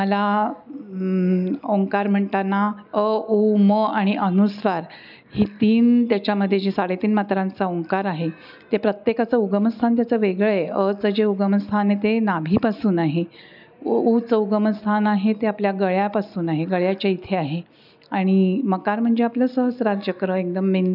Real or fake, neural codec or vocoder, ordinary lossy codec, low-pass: real; none; none; 5.4 kHz